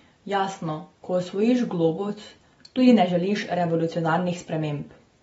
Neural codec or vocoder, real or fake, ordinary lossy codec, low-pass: none; real; AAC, 24 kbps; 19.8 kHz